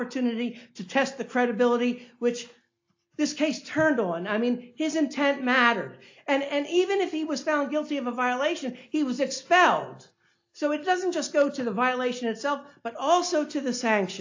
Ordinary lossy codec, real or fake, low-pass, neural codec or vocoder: AAC, 48 kbps; real; 7.2 kHz; none